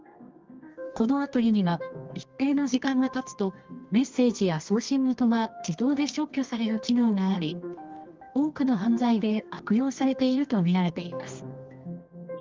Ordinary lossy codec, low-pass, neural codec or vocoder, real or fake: Opus, 32 kbps; 7.2 kHz; codec, 24 kHz, 0.9 kbps, WavTokenizer, medium music audio release; fake